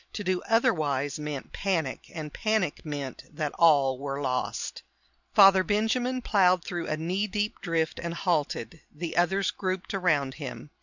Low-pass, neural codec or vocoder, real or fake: 7.2 kHz; none; real